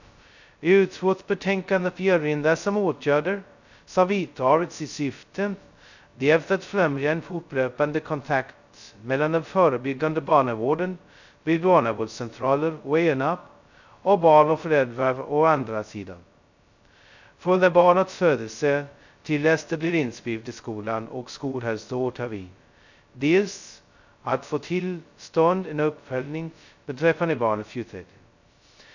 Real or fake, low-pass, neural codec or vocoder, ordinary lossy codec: fake; 7.2 kHz; codec, 16 kHz, 0.2 kbps, FocalCodec; none